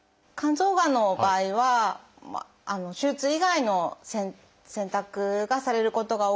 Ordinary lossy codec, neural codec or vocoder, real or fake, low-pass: none; none; real; none